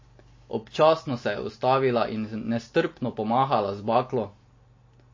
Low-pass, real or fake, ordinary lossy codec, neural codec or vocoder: 7.2 kHz; real; MP3, 32 kbps; none